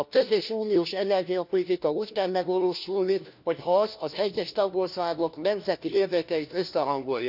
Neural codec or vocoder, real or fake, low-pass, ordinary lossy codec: codec, 16 kHz, 1 kbps, FunCodec, trained on LibriTTS, 50 frames a second; fake; 5.4 kHz; none